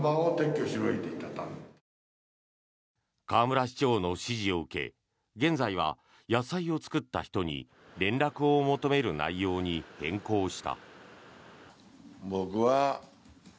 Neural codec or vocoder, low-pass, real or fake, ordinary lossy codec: none; none; real; none